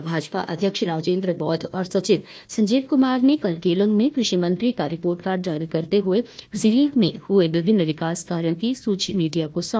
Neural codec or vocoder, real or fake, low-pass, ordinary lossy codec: codec, 16 kHz, 1 kbps, FunCodec, trained on Chinese and English, 50 frames a second; fake; none; none